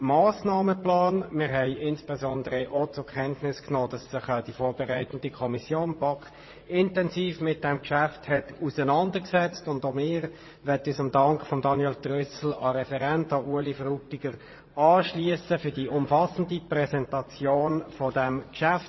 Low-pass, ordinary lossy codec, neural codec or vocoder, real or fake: 7.2 kHz; MP3, 24 kbps; vocoder, 44.1 kHz, 80 mel bands, Vocos; fake